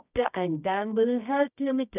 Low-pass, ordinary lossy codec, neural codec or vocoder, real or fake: 3.6 kHz; none; codec, 24 kHz, 0.9 kbps, WavTokenizer, medium music audio release; fake